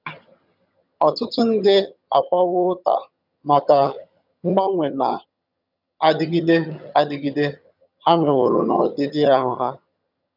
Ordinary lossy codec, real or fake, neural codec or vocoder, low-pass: none; fake; vocoder, 22.05 kHz, 80 mel bands, HiFi-GAN; 5.4 kHz